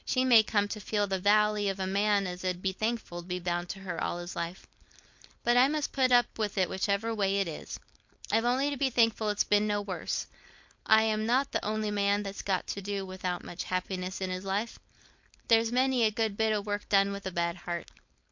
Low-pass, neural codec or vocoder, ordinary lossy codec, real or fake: 7.2 kHz; codec, 16 kHz, 4.8 kbps, FACodec; MP3, 48 kbps; fake